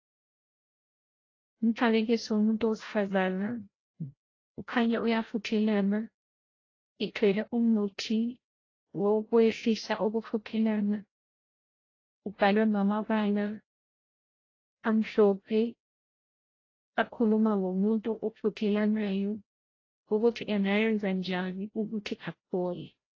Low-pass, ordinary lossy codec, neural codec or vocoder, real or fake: 7.2 kHz; AAC, 32 kbps; codec, 16 kHz, 0.5 kbps, FreqCodec, larger model; fake